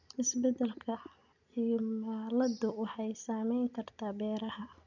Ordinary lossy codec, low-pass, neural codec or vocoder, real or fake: none; 7.2 kHz; none; real